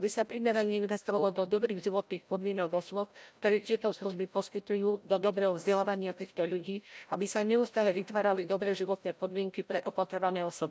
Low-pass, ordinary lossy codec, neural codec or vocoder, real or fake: none; none; codec, 16 kHz, 0.5 kbps, FreqCodec, larger model; fake